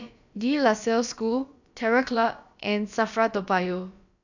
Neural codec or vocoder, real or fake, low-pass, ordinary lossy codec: codec, 16 kHz, about 1 kbps, DyCAST, with the encoder's durations; fake; 7.2 kHz; none